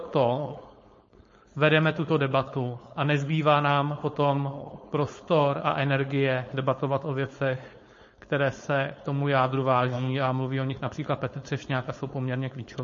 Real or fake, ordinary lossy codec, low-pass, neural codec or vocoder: fake; MP3, 32 kbps; 7.2 kHz; codec, 16 kHz, 4.8 kbps, FACodec